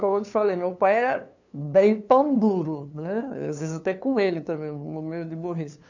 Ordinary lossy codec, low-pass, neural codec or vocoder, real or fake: none; 7.2 kHz; codec, 16 kHz, 2 kbps, FunCodec, trained on LibriTTS, 25 frames a second; fake